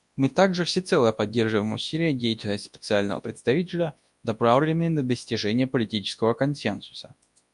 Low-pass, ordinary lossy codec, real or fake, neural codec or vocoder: 10.8 kHz; MP3, 64 kbps; fake; codec, 24 kHz, 0.9 kbps, WavTokenizer, large speech release